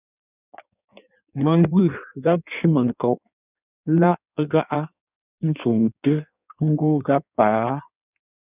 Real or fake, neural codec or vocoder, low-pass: fake; codec, 16 kHz in and 24 kHz out, 1.1 kbps, FireRedTTS-2 codec; 3.6 kHz